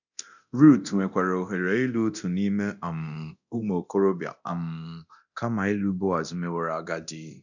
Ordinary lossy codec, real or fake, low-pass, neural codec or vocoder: none; fake; 7.2 kHz; codec, 24 kHz, 0.9 kbps, DualCodec